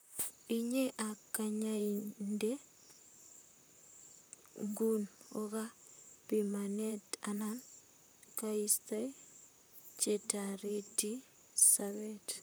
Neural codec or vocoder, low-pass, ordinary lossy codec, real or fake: vocoder, 44.1 kHz, 128 mel bands, Pupu-Vocoder; none; none; fake